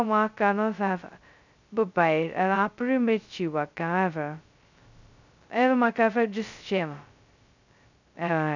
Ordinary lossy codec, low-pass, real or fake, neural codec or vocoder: none; 7.2 kHz; fake; codec, 16 kHz, 0.2 kbps, FocalCodec